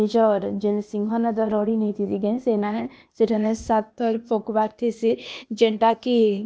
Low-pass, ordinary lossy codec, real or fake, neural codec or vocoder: none; none; fake; codec, 16 kHz, 0.8 kbps, ZipCodec